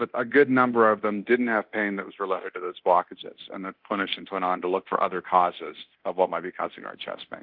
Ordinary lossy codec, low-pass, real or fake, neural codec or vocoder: Opus, 24 kbps; 5.4 kHz; fake; codec, 24 kHz, 0.9 kbps, DualCodec